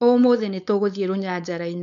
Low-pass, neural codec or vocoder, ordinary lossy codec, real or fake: 7.2 kHz; codec, 16 kHz, 4.8 kbps, FACodec; none; fake